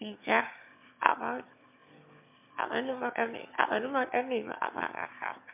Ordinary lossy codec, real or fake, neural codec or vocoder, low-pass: MP3, 32 kbps; fake; autoencoder, 22.05 kHz, a latent of 192 numbers a frame, VITS, trained on one speaker; 3.6 kHz